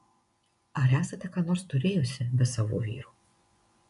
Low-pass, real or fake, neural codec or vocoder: 10.8 kHz; fake; vocoder, 24 kHz, 100 mel bands, Vocos